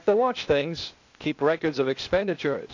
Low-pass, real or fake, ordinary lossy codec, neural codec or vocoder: 7.2 kHz; fake; AAC, 48 kbps; codec, 16 kHz, 0.8 kbps, ZipCodec